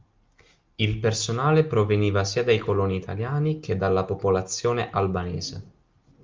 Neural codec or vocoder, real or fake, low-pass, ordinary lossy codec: none; real; 7.2 kHz; Opus, 24 kbps